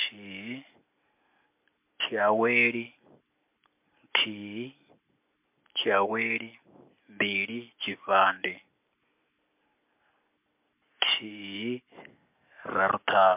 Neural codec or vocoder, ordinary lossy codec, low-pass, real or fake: none; MP3, 32 kbps; 3.6 kHz; real